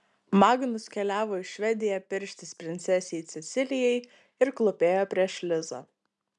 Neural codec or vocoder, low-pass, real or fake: none; 10.8 kHz; real